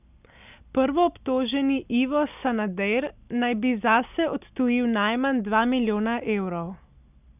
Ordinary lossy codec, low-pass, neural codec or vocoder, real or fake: none; 3.6 kHz; none; real